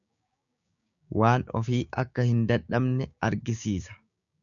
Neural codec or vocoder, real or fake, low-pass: codec, 16 kHz, 6 kbps, DAC; fake; 7.2 kHz